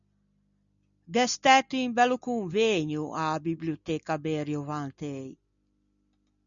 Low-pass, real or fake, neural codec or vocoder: 7.2 kHz; real; none